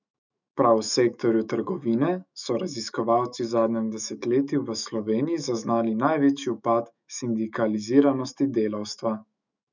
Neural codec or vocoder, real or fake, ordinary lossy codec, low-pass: autoencoder, 48 kHz, 128 numbers a frame, DAC-VAE, trained on Japanese speech; fake; none; 7.2 kHz